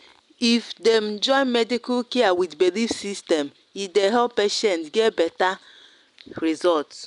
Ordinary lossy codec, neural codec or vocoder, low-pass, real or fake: none; none; 10.8 kHz; real